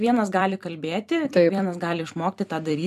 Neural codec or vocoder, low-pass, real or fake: vocoder, 44.1 kHz, 128 mel bands every 256 samples, BigVGAN v2; 14.4 kHz; fake